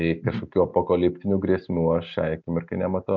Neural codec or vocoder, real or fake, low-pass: none; real; 7.2 kHz